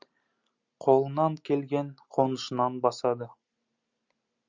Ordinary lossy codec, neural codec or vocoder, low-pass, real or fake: Opus, 64 kbps; none; 7.2 kHz; real